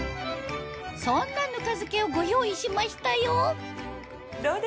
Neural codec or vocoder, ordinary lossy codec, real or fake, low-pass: none; none; real; none